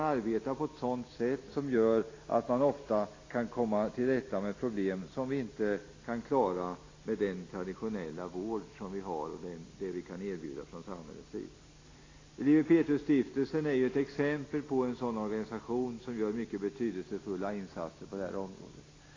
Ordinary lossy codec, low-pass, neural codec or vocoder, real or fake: AAC, 32 kbps; 7.2 kHz; none; real